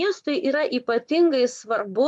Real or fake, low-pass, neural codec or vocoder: fake; 10.8 kHz; vocoder, 24 kHz, 100 mel bands, Vocos